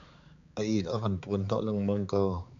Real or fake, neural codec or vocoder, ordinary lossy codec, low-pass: fake; codec, 16 kHz, 4 kbps, X-Codec, HuBERT features, trained on balanced general audio; MP3, 64 kbps; 7.2 kHz